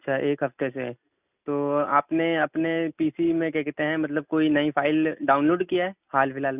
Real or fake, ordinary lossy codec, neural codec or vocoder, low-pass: real; none; none; 3.6 kHz